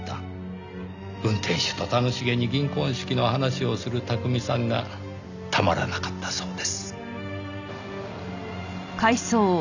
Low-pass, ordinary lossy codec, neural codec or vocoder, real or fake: 7.2 kHz; none; none; real